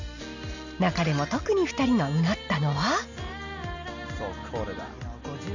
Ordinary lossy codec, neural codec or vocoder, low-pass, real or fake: none; none; 7.2 kHz; real